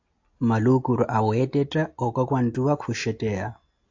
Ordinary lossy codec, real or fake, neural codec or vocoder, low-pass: AAC, 48 kbps; real; none; 7.2 kHz